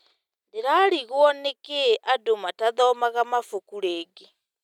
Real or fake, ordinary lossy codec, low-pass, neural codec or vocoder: real; none; 19.8 kHz; none